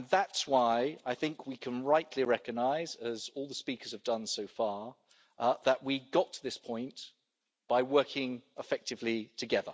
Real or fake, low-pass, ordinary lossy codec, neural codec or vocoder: real; none; none; none